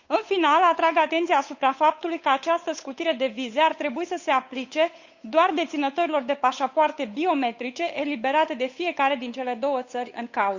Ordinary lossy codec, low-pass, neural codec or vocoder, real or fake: Opus, 64 kbps; 7.2 kHz; codec, 16 kHz, 8 kbps, FunCodec, trained on Chinese and English, 25 frames a second; fake